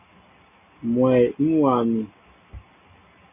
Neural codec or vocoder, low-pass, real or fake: none; 3.6 kHz; real